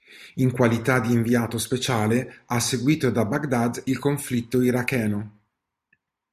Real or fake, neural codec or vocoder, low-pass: real; none; 14.4 kHz